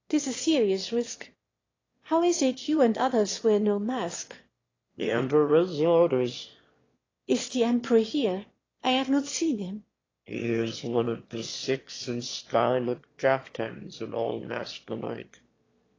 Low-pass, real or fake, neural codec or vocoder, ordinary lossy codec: 7.2 kHz; fake; autoencoder, 22.05 kHz, a latent of 192 numbers a frame, VITS, trained on one speaker; AAC, 32 kbps